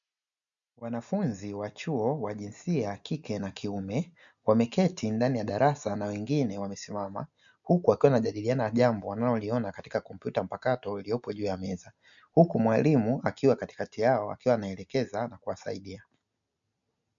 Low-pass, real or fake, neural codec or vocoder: 7.2 kHz; real; none